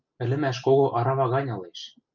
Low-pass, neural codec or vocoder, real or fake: 7.2 kHz; none; real